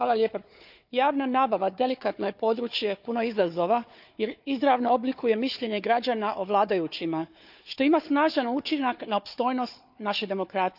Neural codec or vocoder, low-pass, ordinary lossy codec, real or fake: codec, 16 kHz, 4 kbps, FunCodec, trained on Chinese and English, 50 frames a second; 5.4 kHz; none; fake